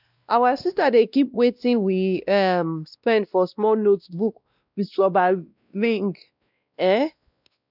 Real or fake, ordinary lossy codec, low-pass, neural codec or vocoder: fake; none; 5.4 kHz; codec, 16 kHz, 1 kbps, X-Codec, WavLM features, trained on Multilingual LibriSpeech